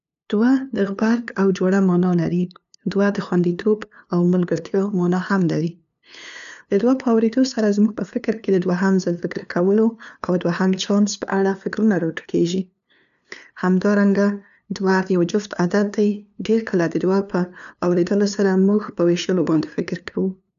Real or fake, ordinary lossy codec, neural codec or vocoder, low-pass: fake; none; codec, 16 kHz, 2 kbps, FunCodec, trained on LibriTTS, 25 frames a second; 7.2 kHz